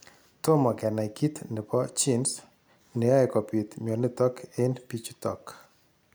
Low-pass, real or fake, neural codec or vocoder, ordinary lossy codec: none; real; none; none